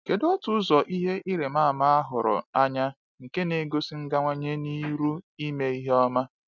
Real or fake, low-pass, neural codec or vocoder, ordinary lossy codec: real; 7.2 kHz; none; none